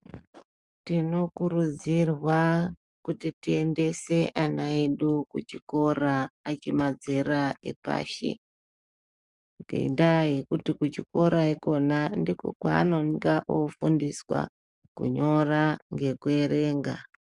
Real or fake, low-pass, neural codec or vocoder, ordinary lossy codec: fake; 10.8 kHz; codec, 44.1 kHz, 7.8 kbps, DAC; Opus, 64 kbps